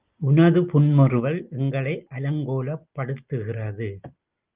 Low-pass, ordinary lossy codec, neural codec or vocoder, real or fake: 3.6 kHz; Opus, 24 kbps; none; real